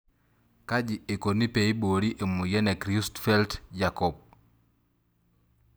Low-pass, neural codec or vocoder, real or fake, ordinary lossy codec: none; none; real; none